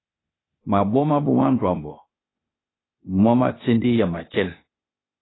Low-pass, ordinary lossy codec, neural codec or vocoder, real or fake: 7.2 kHz; AAC, 16 kbps; codec, 16 kHz, 0.8 kbps, ZipCodec; fake